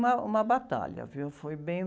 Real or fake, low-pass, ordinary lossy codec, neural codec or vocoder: real; none; none; none